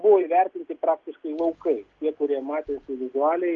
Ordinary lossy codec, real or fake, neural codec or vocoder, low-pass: Opus, 32 kbps; real; none; 7.2 kHz